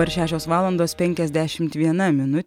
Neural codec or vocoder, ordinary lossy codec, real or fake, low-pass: none; MP3, 96 kbps; real; 19.8 kHz